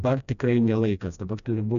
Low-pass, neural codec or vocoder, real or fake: 7.2 kHz; codec, 16 kHz, 1 kbps, FreqCodec, smaller model; fake